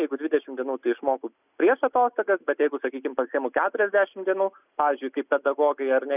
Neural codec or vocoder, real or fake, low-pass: none; real; 3.6 kHz